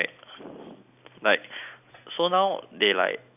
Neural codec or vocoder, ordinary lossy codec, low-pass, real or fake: none; none; 3.6 kHz; real